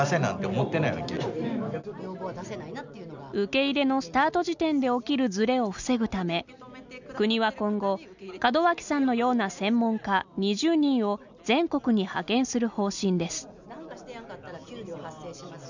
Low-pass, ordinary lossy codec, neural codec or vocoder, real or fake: 7.2 kHz; none; none; real